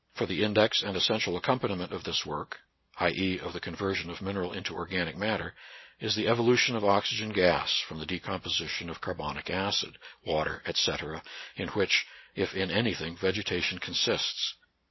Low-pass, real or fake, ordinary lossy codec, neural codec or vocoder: 7.2 kHz; real; MP3, 24 kbps; none